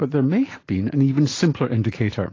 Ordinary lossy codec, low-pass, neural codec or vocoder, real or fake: AAC, 32 kbps; 7.2 kHz; none; real